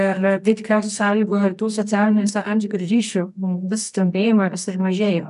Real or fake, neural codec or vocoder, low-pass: fake; codec, 24 kHz, 0.9 kbps, WavTokenizer, medium music audio release; 10.8 kHz